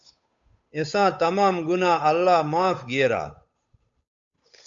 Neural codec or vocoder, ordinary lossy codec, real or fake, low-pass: codec, 16 kHz, 8 kbps, FunCodec, trained on Chinese and English, 25 frames a second; MP3, 64 kbps; fake; 7.2 kHz